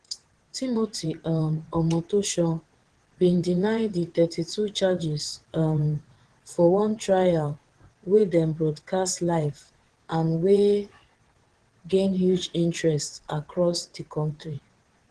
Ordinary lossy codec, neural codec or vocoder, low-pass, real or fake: Opus, 16 kbps; vocoder, 22.05 kHz, 80 mel bands, WaveNeXt; 9.9 kHz; fake